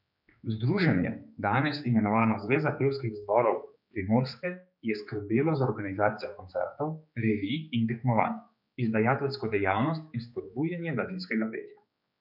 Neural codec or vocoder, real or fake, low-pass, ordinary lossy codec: codec, 16 kHz, 4 kbps, X-Codec, HuBERT features, trained on general audio; fake; 5.4 kHz; none